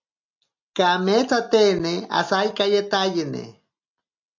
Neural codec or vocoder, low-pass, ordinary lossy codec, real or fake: none; 7.2 kHz; MP3, 64 kbps; real